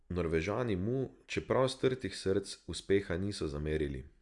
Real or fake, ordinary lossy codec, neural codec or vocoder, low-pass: real; Opus, 64 kbps; none; 10.8 kHz